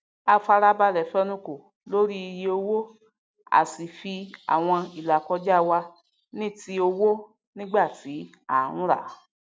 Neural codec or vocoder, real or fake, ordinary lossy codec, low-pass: none; real; none; none